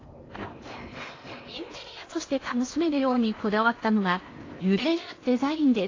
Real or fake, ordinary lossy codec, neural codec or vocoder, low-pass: fake; AAC, 32 kbps; codec, 16 kHz in and 24 kHz out, 0.8 kbps, FocalCodec, streaming, 65536 codes; 7.2 kHz